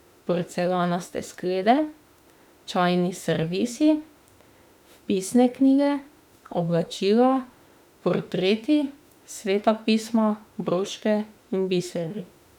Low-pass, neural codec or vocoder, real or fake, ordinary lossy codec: 19.8 kHz; autoencoder, 48 kHz, 32 numbers a frame, DAC-VAE, trained on Japanese speech; fake; none